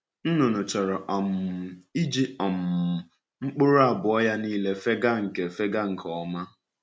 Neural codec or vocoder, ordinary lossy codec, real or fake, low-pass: none; none; real; none